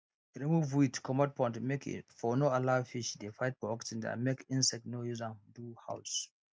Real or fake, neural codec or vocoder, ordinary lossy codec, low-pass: real; none; none; none